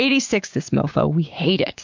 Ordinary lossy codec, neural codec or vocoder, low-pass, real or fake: MP3, 64 kbps; codec, 16 kHz, 6 kbps, DAC; 7.2 kHz; fake